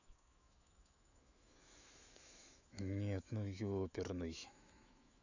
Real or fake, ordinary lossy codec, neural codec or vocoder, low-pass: fake; none; autoencoder, 48 kHz, 128 numbers a frame, DAC-VAE, trained on Japanese speech; 7.2 kHz